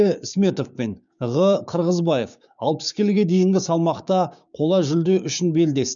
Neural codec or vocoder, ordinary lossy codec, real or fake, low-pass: codec, 16 kHz, 6 kbps, DAC; none; fake; 7.2 kHz